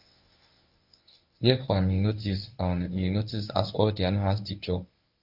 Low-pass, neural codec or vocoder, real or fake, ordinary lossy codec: 5.4 kHz; codec, 24 kHz, 0.9 kbps, WavTokenizer, medium speech release version 1; fake; none